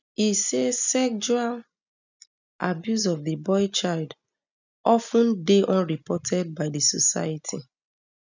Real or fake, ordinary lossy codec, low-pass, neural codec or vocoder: real; none; 7.2 kHz; none